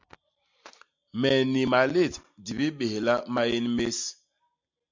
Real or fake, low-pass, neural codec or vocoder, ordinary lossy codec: real; 7.2 kHz; none; MP3, 48 kbps